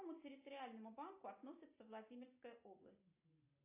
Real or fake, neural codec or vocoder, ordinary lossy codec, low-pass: real; none; AAC, 24 kbps; 3.6 kHz